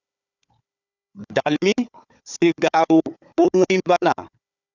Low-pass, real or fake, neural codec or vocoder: 7.2 kHz; fake; codec, 16 kHz, 4 kbps, FunCodec, trained on Chinese and English, 50 frames a second